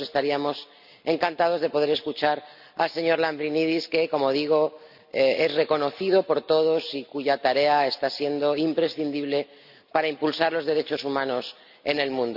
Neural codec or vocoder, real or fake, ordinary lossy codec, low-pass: none; real; none; 5.4 kHz